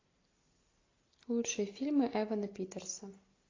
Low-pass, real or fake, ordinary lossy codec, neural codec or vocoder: 7.2 kHz; real; AAC, 32 kbps; none